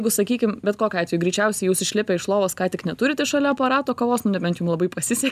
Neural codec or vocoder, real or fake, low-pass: none; real; 14.4 kHz